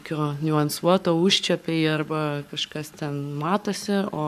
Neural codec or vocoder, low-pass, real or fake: codec, 44.1 kHz, 7.8 kbps, Pupu-Codec; 14.4 kHz; fake